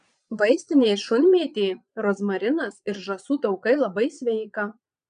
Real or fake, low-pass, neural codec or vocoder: fake; 9.9 kHz; vocoder, 22.05 kHz, 80 mel bands, Vocos